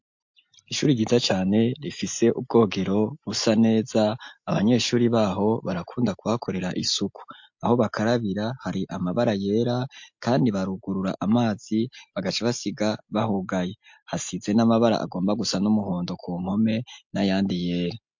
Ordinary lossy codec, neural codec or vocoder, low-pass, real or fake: MP3, 48 kbps; none; 7.2 kHz; real